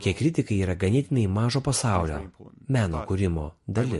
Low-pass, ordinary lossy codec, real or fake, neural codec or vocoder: 14.4 kHz; MP3, 48 kbps; real; none